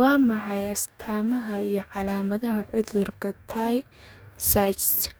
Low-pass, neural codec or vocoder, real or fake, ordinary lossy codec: none; codec, 44.1 kHz, 2.6 kbps, DAC; fake; none